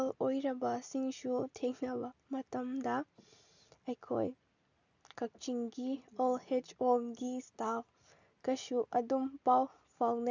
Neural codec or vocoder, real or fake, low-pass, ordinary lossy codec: none; real; 7.2 kHz; none